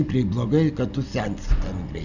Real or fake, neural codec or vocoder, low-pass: real; none; 7.2 kHz